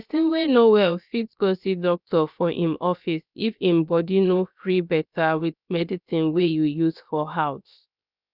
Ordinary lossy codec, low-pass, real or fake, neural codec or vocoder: none; 5.4 kHz; fake; codec, 16 kHz, about 1 kbps, DyCAST, with the encoder's durations